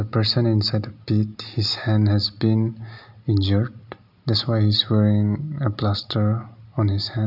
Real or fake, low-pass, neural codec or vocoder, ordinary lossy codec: real; 5.4 kHz; none; none